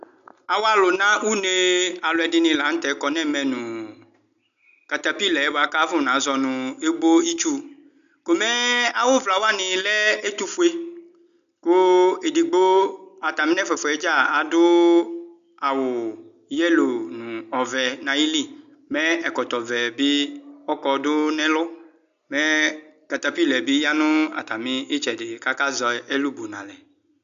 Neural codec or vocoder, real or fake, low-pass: none; real; 7.2 kHz